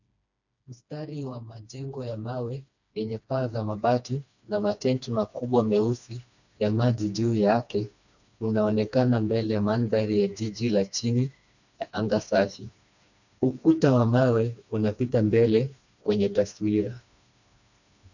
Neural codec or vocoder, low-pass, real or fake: codec, 16 kHz, 2 kbps, FreqCodec, smaller model; 7.2 kHz; fake